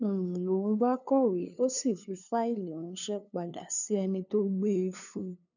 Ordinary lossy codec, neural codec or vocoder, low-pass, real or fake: none; codec, 16 kHz, 2 kbps, FunCodec, trained on LibriTTS, 25 frames a second; 7.2 kHz; fake